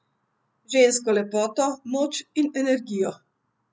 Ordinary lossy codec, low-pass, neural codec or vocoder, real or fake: none; none; none; real